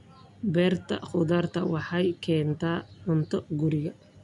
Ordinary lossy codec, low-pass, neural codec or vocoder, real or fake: none; 10.8 kHz; none; real